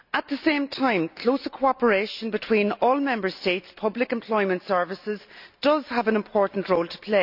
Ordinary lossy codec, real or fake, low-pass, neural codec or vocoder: none; real; 5.4 kHz; none